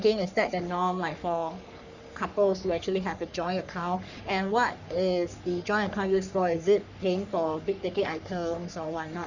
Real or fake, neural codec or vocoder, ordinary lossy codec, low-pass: fake; codec, 44.1 kHz, 3.4 kbps, Pupu-Codec; none; 7.2 kHz